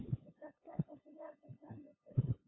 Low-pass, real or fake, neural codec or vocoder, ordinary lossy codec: 7.2 kHz; fake; codec, 16 kHz, 2 kbps, FunCodec, trained on LibriTTS, 25 frames a second; AAC, 16 kbps